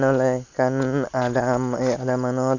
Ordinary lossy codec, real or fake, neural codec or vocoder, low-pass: none; real; none; 7.2 kHz